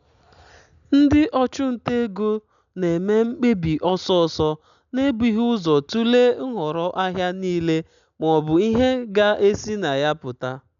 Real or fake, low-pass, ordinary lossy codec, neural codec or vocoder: real; 7.2 kHz; none; none